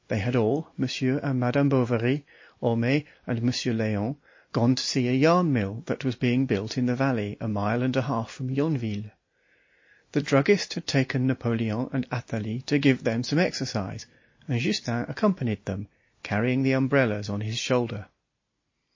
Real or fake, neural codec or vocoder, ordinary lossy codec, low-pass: real; none; MP3, 32 kbps; 7.2 kHz